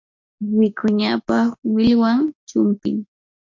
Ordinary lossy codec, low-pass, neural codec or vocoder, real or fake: MP3, 48 kbps; 7.2 kHz; none; real